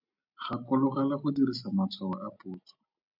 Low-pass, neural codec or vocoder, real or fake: 5.4 kHz; none; real